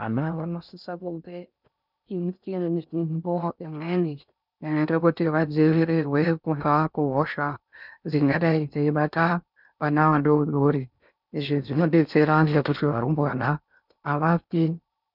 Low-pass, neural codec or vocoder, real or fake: 5.4 kHz; codec, 16 kHz in and 24 kHz out, 0.6 kbps, FocalCodec, streaming, 4096 codes; fake